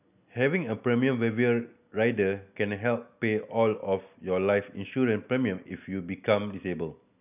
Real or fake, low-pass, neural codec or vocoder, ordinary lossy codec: real; 3.6 kHz; none; none